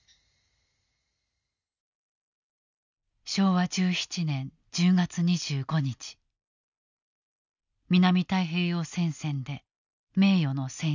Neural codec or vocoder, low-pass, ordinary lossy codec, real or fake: none; 7.2 kHz; none; real